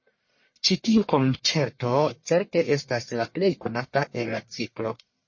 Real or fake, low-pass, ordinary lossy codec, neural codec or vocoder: fake; 7.2 kHz; MP3, 32 kbps; codec, 44.1 kHz, 1.7 kbps, Pupu-Codec